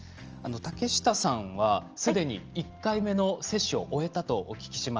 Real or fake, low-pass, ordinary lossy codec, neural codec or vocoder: real; 7.2 kHz; Opus, 24 kbps; none